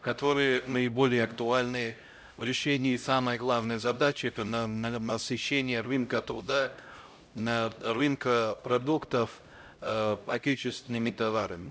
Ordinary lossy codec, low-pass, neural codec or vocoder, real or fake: none; none; codec, 16 kHz, 0.5 kbps, X-Codec, HuBERT features, trained on LibriSpeech; fake